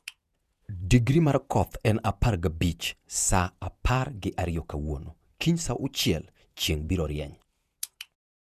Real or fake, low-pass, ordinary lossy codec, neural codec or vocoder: fake; 14.4 kHz; none; vocoder, 48 kHz, 128 mel bands, Vocos